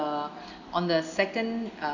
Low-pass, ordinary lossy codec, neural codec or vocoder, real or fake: 7.2 kHz; none; none; real